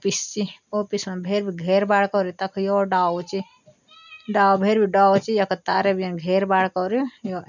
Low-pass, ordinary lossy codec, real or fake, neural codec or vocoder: 7.2 kHz; none; real; none